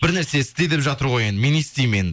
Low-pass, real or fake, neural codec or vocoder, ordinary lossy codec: none; real; none; none